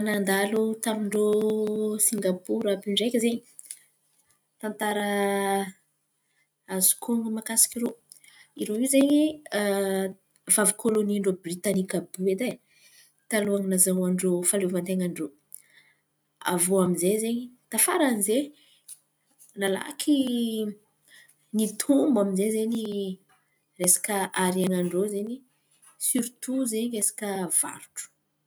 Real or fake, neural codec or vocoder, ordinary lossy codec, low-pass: real; none; none; none